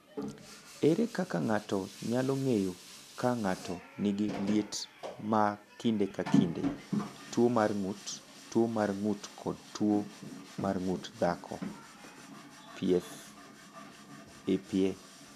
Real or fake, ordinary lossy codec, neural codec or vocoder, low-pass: real; none; none; 14.4 kHz